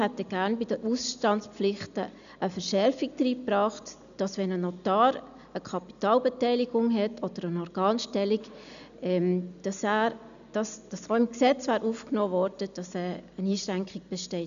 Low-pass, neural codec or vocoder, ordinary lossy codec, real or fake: 7.2 kHz; none; none; real